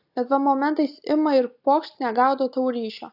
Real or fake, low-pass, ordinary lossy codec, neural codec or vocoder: real; 5.4 kHz; AAC, 48 kbps; none